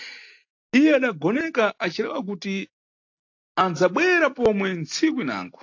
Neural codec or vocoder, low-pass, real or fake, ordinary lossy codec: none; 7.2 kHz; real; AAC, 48 kbps